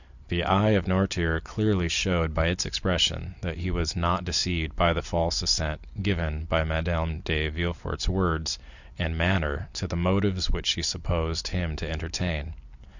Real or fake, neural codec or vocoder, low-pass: fake; vocoder, 44.1 kHz, 128 mel bands every 256 samples, BigVGAN v2; 7.2 kHz